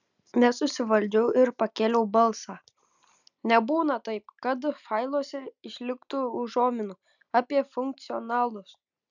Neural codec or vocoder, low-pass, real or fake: none; 7.2 kHz; real